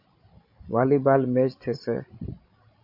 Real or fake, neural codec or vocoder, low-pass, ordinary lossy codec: fake; codec, 16 kHz, 16 kbps, FreqCodec, larger model; 5.4 kHz; MP3, 32 kbps